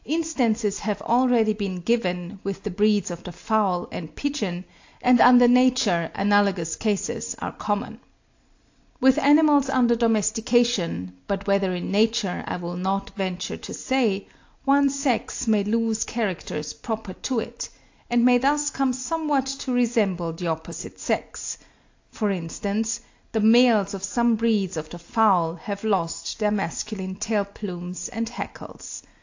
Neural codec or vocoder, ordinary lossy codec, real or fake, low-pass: none; AAC, 48 kbps; real; 7.2 kHz